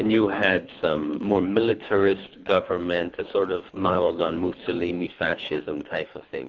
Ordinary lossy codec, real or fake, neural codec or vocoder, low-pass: Opus, 64 kbps; fake; codec, 24 kHz, 3 kbps, HILCodec; 7.2 kHz